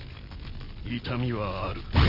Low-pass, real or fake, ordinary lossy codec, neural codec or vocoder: 5.4 kHz; real; none; none